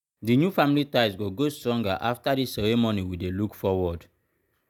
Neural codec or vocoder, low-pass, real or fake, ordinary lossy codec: none; 19.8 kHz; real; none